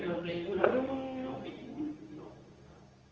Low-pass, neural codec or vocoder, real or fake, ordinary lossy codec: 7.2 kHz; codec, 24 kHz, 0.9 kbps, WavTokenizer, medium speech release version 2; fake; Opus, 24 kbps